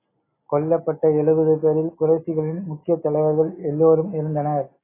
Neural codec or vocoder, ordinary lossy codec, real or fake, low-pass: none; AAC, 16 kbps; real; 3.6 kHz